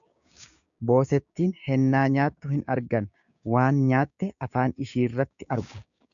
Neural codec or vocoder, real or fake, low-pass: codec, 16 kHz, 6 kbps, DAC; fake; 7.2 kHz